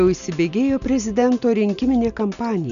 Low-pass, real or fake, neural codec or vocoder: 7.2 kHz; real; none